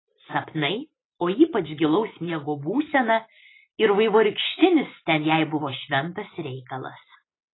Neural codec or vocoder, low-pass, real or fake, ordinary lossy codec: none; 7.2 kHz; real; AAC, 16 kbps